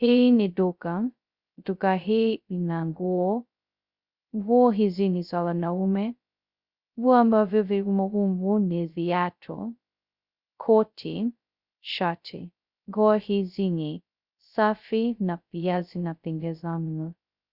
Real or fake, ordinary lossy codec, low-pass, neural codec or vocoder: fake; Opus, 64 kbps; 5.4 kHz; codec, 16 kHz, 0.2 kbps, FocalCodec